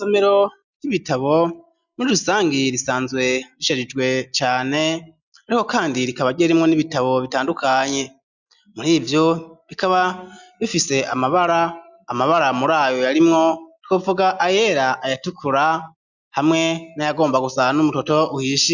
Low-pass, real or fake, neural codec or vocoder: 7.2 kHz; real; none